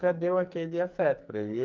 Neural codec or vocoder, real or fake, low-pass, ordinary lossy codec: codec, 44.1 kHz, 2.6 kbps, SNAC; fake; 7.2 kHz; Opus, 24 kbps